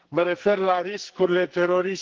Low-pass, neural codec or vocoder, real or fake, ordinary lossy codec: 7.2 kHz; codec, 44.1 kHz, 2.6 kbps, SNAC; fake; Opus, 16 kbps